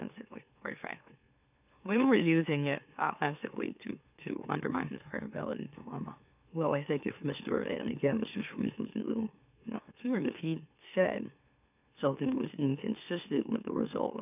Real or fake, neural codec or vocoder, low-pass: fake; autoencoder, 44.1 kHz, a latent of 192 numbers a frame, MeloTTS; 3.6 kHz